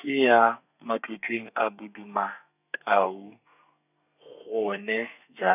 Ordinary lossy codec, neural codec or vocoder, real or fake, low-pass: none; codec, 32 kHz, 1.9 kbps, SNAC; fake; 3.6 kHz